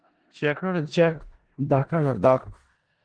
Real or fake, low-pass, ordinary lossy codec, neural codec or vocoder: fake; 9.9 kHz; Opus, 16 kbps; codec, 16 kHz in and 24 kHz out, 0.4 kbps, LongCat-Audio-Codec, four codebook decoder